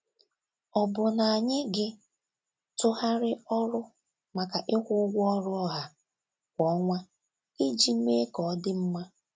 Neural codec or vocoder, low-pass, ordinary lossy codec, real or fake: none; none; none; real